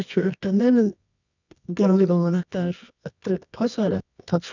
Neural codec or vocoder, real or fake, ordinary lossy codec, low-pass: codec, 24 kHz, 0.9 kbps, WavTokenizer, medium music audio release; fake; none; 7.2 kHz